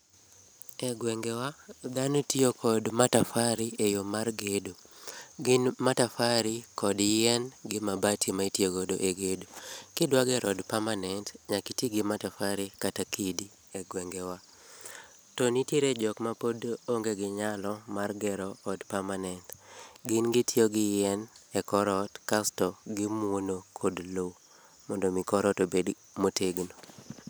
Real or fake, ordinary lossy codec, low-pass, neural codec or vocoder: real; none; none; none